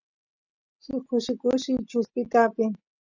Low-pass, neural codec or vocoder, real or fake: 7.2 kHz; none; real